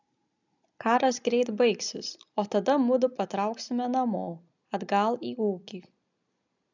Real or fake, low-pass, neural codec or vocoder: real; 7.2 kHz; none